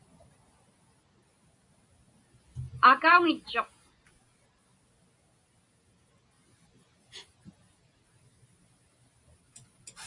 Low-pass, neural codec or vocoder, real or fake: 10.8 kHz; none; real